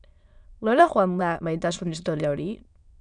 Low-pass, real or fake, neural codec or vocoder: 9.9 kHz; fake; autoencoder, 22.05 kHz, a latent of 192 numbers a frame, VITS, trained on many speakers